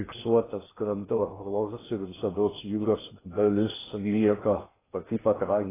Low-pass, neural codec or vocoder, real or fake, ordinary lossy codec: 3.6 kHz; codec, 16 kHz in and 24 kHz out, 0.6 kbps, FocalCodec, streaming, 2048 codes; fake; AAC, 16 kbps